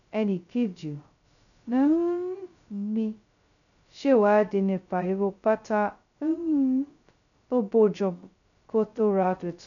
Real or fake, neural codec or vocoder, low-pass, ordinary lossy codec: fake; codec, 16 kHz, 0.2 kbps, FocalCodec; 7.2 kHz; none